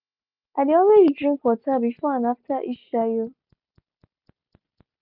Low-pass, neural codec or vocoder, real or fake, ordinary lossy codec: 5.4 kHz; none; real; none